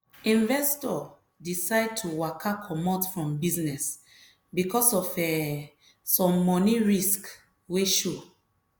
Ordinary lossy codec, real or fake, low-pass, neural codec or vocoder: none; real; none; none